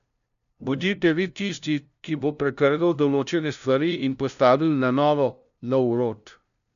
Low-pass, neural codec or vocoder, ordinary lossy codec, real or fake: 7.2 kHz; codec, 16 kHz, 0.5 kbps, FunCodec, trained on LibriTTS, 25 frames a second; none; fake